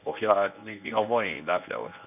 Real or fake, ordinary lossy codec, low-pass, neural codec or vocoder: fake; none; 3.6 kHz; codec, 24 kHz, 0.9 kbps, WavTokenizer, medium speech release version 1